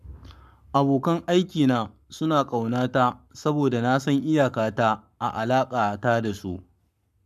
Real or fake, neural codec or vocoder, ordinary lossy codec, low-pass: fake; codec, 44.1 kHz, 7.8 kbps, Pupu-Codec; none; 14.4 kHz